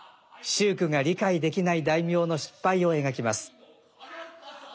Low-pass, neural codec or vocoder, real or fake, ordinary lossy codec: none; none; real; none